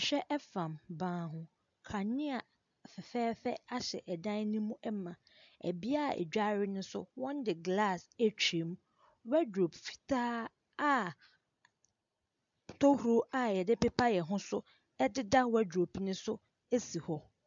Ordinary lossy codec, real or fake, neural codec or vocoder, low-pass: MP3, 64 kbps; real; none; 7.2 kHz